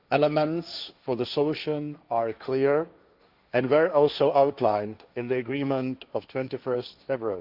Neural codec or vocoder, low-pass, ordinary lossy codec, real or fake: codec, 16 kHz, 1.1 kbps, Voila-Tokenizer; 5.4 kHz; Opus, 64 kbps; fake